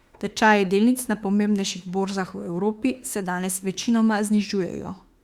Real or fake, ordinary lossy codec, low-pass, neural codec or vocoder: fake; Opus, 64 kbps; 19.8 kHz; autoencoder, 48 kHz, 32 numbers a frame, DAC-VAE, trained on Japanese speech